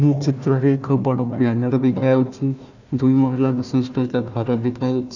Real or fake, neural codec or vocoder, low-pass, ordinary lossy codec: fake; codec, 16 kHz, 1 kbps, FunCodec, trained on Chinese and English, 50 frames a second; 7.2 kHz; none